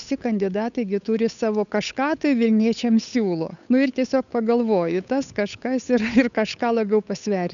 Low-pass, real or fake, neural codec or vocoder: 7.2 kHz; fake; codec, 16 kHz, 8 kbps, FunCodec, trained on Chinese and English, 25 frames a second